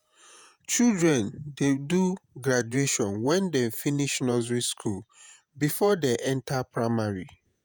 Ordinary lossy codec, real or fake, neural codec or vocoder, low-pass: none; fake; vocoder, 48 kHz, 128 mel bands, Vocos; none